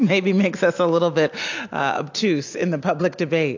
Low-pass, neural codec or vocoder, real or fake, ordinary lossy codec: 7.2 kHz; none; real; AAC, 48 kbps